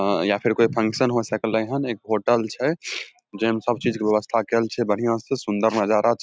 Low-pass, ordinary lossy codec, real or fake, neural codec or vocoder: none; none; real; none